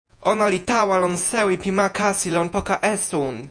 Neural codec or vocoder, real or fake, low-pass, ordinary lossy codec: vocoder, 48 kHz, 128 mel bands, Vocos; fake; 9.9 kHz; MP3, 96 kbps